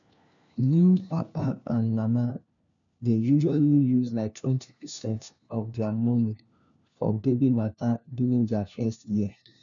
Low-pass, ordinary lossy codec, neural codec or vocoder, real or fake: 7.2 kHz; none; codec, 16 kHz, 1 kbps, FunCodec, trained on LibriTTS, 50 frames a second; fake